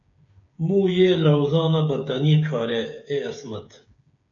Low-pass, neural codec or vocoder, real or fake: 7.2 kHz; codec, 16 kHz, 8 kbps, FreqCodec, smaller model; fake